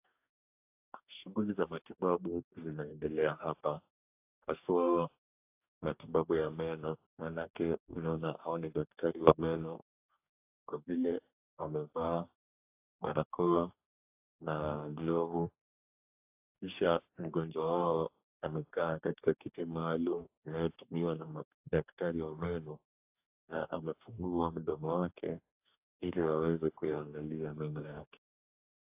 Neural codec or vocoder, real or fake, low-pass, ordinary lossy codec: codec, 44.1 kHz, 2.6 kbps, DAC; fake; 3.6 kHz; AAC, 32 kbps